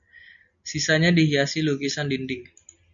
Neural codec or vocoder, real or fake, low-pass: none; real; 7.2 kHz